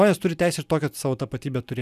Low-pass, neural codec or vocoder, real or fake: 14.4 kHz; none; real